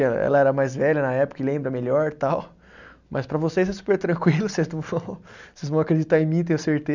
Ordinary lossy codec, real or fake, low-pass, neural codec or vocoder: none; real; 7.2 kHz; none